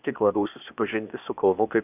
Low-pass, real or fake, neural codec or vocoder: 3.6 kHz; fake; codec, 16 kHz, 0.8 kbps, ZipCodec